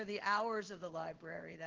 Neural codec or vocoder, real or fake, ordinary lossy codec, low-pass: codec, 16 kHz in and 24 kHz out, 1 kbps, XY-Tokenizer; fake; Opus, 16 kbps; 7.2 kHz